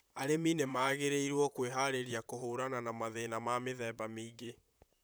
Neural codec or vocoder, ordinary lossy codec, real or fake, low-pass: vocoder, 44.1 kHz, 128 mel bands, Pupu-Vocoder; none; fake; none